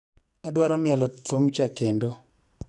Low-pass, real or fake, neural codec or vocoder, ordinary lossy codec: 10.8 kHz; fake; codec, 44.1 kHz, 2.6 kbps, SNAC; none